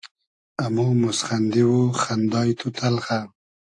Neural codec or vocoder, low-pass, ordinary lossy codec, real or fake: none; 10.8 kHz; AAC, 48 kbps; real